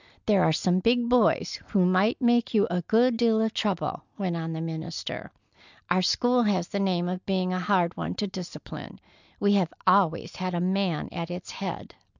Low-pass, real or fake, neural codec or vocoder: 7.2 kHz; real; none